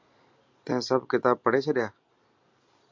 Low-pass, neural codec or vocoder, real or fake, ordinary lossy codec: 7.2 kHz; none; real; MP3, 64 kbps